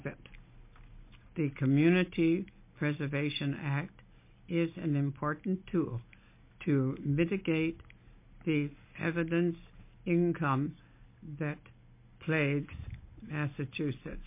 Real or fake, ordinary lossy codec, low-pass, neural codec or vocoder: real; MP3, 24 kbps; 3.6 kHz; none